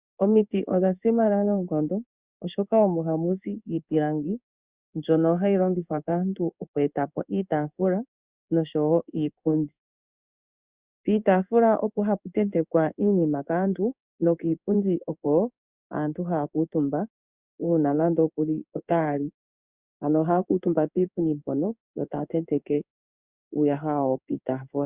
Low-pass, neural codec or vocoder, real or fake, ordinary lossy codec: 3.6 kHz; codec, 16 kHz in and 24 kHz out, 1 kbps, XY-Tokenizer; fake; Opus, 64 kbps